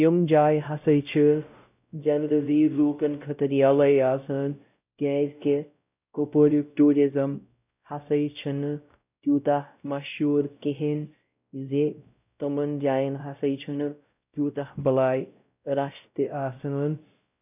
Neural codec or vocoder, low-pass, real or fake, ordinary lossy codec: codec, 16 kHz, 0.5 kbps, X-Codec, WavLM features, trained on Multilingual LibriSpeech; 3.6 kHz; fake; none